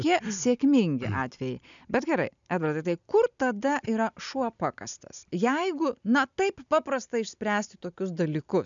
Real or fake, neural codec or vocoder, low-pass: real; none; 7.2 kHz